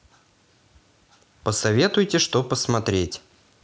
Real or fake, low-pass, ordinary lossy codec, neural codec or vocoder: real; none; none; none